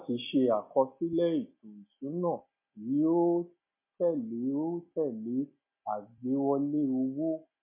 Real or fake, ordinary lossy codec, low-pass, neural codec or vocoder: real; MP3, 24 kbps; 3.6 kHz; none